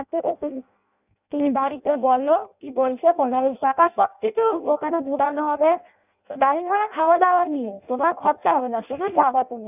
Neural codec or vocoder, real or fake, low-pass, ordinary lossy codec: codec, 16 kHz in and 24 kHz out, 0.6 kbps, FireRedTTS-2 codec; fake; 3.6 kHz; none